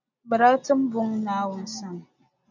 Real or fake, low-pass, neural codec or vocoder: real; 7.2 kHz; none